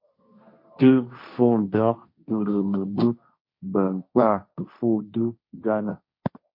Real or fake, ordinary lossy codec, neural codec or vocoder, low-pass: fake; MP3, 32 kbps; codec, 16 kHz, 1.1 kbps, Voila-Tokenizer; 5.4 kHz